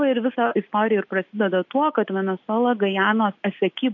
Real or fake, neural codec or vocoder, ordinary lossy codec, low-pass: real; none; MP3, 48 kbps; 7.2 kHz